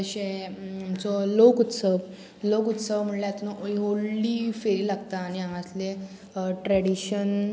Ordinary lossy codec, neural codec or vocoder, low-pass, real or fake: none; none; none; real